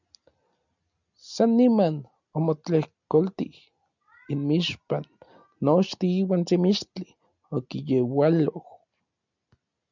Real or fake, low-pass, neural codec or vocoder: real; 7.2 kHz; none